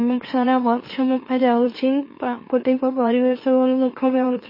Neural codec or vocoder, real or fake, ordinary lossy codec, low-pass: autoencoder, 44.1 kHz, a latent of 192 numbers a frame, MeloTTS; fake; MP3, 24 kbps; 5.4 kHz